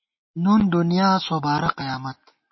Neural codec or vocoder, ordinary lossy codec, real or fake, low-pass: none; MP3, 24 kbps; real; 7.2 kHz